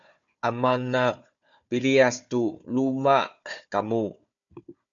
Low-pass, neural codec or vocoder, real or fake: 7.2 kHz; codec, 16 kHz, 4 kbps, FunCodec, trained on Chinese and English, 50 frames a second; fake